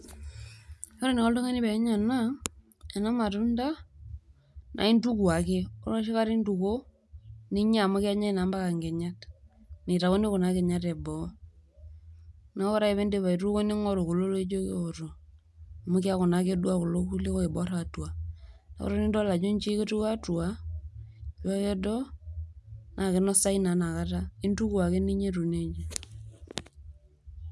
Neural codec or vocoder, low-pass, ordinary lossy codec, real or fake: none; none; none; real